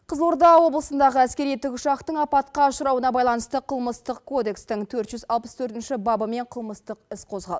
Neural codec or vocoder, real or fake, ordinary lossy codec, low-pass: none; real; none; none